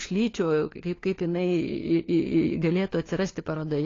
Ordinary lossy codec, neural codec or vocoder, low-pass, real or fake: AAC, 32 kbps; codec, 16 kHz, 4 kbps, FunCodec, trained on LibriTTS, 50 frames a second; 7.2 kHz; fake